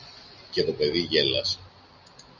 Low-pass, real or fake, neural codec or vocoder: 7.2 kHz; real; none